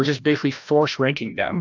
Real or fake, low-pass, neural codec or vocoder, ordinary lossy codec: fake; 7.2 kHz; codec, 16 kHz, 1 kbps, FreqCodec, larger model; AAC, 48 kbps